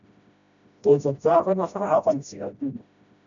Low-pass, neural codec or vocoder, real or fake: 7.2 kHz; codec, 16 kHz, 0.5 kbps, FreqCodec, smaller model; fake